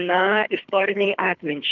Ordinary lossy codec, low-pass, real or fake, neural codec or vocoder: Opus, 24 kbps; 7.2 kHz; fake; codec, 24 kHz, 3 kbps, HILCodec